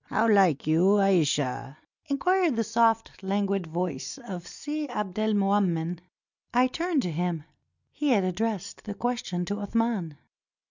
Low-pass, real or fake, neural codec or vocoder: 7.2 kHz; real; none